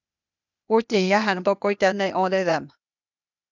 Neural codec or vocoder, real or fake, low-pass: codec, 16 kHz, 0.8 kbps, ZipCodec; fake; 7.2 kHz